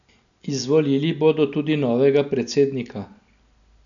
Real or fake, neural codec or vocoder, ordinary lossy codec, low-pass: real; none; none; 7.2 kHz